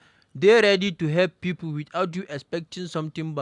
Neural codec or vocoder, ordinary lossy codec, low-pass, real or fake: none; MP3, 96 kbps; 10.8 kHz; real